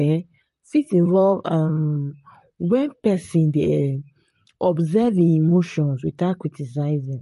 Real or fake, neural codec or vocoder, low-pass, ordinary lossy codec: fake; vocoder, 44.1 kHz, 128 mel bands, Pupu-Vocoder; 14.4 kHz; MP3, 48 kbps